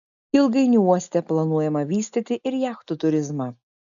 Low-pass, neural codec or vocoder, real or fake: 7.2 kHz; none; real